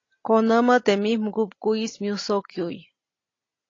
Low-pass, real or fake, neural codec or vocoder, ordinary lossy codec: 7.2 kHz; real; none; AAC, 32 kbps